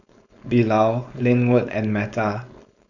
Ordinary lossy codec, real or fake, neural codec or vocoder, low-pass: none; fake; codec, 16 kHz, 4.8 kbps, FACodec; 7.2 kHz